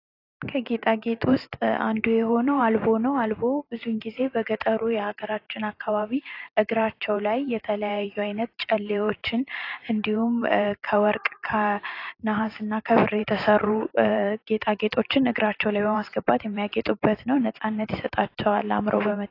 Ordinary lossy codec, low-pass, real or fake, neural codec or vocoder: AAC, 32 kbps; 5.4 kHz; fake; vocoder, 44.1 kHz, 128 mel bands every 512 samples, BigVGAN v2